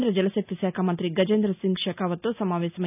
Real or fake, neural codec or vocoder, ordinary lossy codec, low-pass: real; none; none; 3.6 kHz